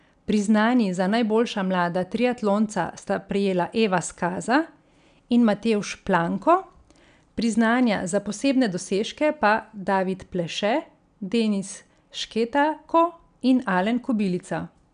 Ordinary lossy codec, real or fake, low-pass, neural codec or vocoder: none; real; 9.9 kHz; none